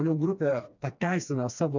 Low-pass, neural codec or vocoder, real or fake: 7.2 kHz; codec, 16 kHz, 2 kbps, FreqCodec, smaller model; fake